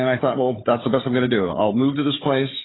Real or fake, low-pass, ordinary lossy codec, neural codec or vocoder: fake; 7.2 kHz; AAC, 16 kbps; codec, 16 kHz, 4 kbps, FunCodec, trained on Chinese and English, 50 frames a second